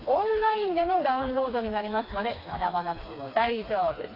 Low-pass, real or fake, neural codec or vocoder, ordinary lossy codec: 5.4 kHz; fake; codec, 16 kHz, 2 kbps, FreqCodec, smaller model; AAC, 32 kbps